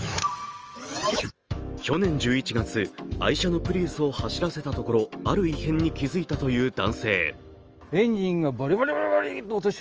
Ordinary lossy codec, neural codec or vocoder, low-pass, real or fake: Opus, 24 kbps; autoencoder, 48 kHz, 128 numbers a frame, DAC-VAE, trained on Japanese speech; 7.2 kHz; fake